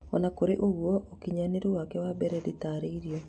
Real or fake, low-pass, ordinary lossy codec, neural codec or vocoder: real; 9.9 kHz; none; none